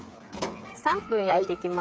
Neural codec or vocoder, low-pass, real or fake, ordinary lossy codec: codec, 16 kHz, 8 kbps, FreqCodec, smaller model; none; fake; none